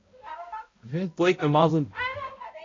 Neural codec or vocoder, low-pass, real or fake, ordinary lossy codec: codec, 16 kHz, 0.5 kbps, X-Codec, HuBERT features, trained on balanced general audio; 7.2 kHz; fake; AAC, 32 kbps